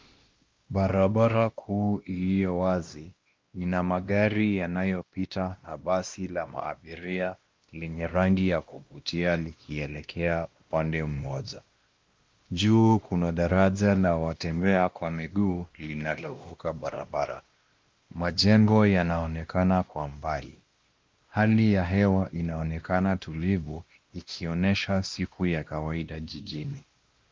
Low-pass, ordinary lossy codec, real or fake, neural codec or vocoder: 7.2 kHz; Opus, 32 kbps; fake; codec, 16 kHz, 1 kbps, X-Codec, WavLM features, trained on Multilingual LibriSpeech